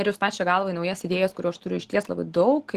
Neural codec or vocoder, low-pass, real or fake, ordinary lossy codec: none; 14.4 kHz; real; Opus, 16 kbps